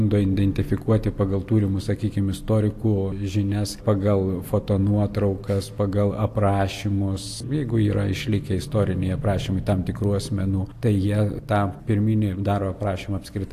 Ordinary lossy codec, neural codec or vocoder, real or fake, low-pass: AAC, 64 kbps; none; real; 14.4 kHz